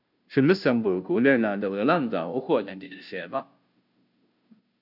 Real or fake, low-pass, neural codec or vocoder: fake; 5.4 kHz; codec, 16 kHz, 0.5 kbps, FunCodec, trained on Chinese and English, 25 frames a second